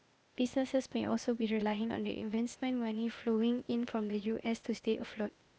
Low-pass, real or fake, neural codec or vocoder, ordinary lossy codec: none; fake; codec, 16 kHz, 0.8 kbps, ZipCodec; none